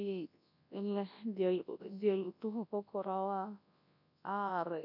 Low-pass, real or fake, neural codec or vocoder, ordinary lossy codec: 5.4 kHz; fake; codec, 24 kHz, 0.9 kbps, WavTokenizer, large speech release; none